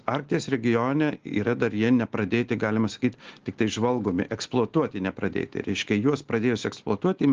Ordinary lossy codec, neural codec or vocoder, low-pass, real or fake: Opus, 16 kbps; none; 7.2 kHz; real